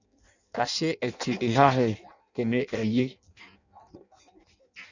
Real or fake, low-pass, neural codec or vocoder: fake; 7.2 kHz; codec, 16 kHz in and 24 kHz out, 0.6 kbps, FireRedTTS-2 codec